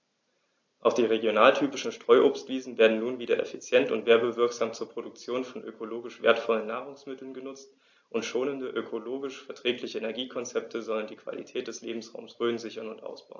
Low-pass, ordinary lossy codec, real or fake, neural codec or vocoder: 7.2 kHz; AAC, 48 kbps; real; none